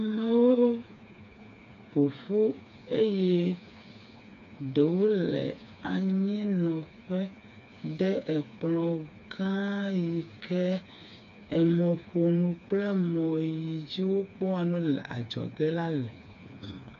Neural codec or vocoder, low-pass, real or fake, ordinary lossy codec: codec, 16 kHz, 4 kbps, FreqCodec, smaller model; 7.2 kHz; fake; AAC, 96 kbps